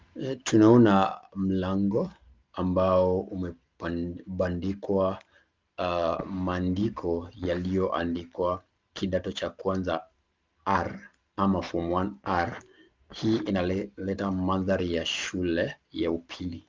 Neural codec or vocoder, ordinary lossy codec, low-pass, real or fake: none; Opus, 32 kbps; 7.2 kHz; real